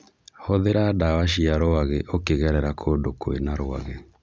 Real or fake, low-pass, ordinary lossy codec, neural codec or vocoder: real; none; none; none